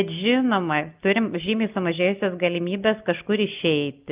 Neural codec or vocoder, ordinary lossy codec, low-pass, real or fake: none; Opus, 24 kbps; 3.6 kHz; real